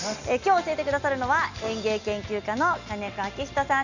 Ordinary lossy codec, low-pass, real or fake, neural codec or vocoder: none; 7.2 kHz; real; none